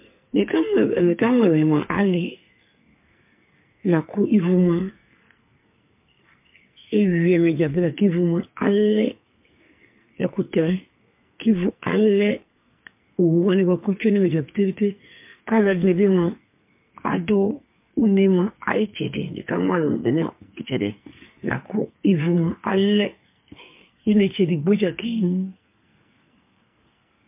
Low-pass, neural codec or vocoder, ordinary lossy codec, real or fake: 3.6 kHz; codec, 44.1 kHz, 2.6 kbps, SNAC; MP3, 32 kbps; fake